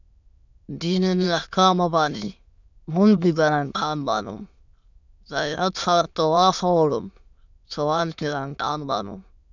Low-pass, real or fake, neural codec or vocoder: 7.2 kHz; fake; autoencoder, 22.05 kHz, a latent of 192 numbers a frame, VITS, trained on many speakers